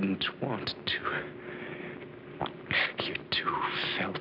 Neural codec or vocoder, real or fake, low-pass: none; real; 5.4 kHz